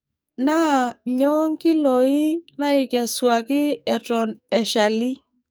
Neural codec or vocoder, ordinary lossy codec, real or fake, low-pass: codec, 44.1 kHz, 2.6 kbps, SNAC; none; fake; none